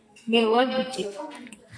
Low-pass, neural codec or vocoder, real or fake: 9.9 kHz; codec, 44.1 kHz, 2.6 kbps, SNAC; fake